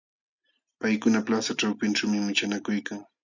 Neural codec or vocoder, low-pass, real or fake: none; 7.2 kHz; real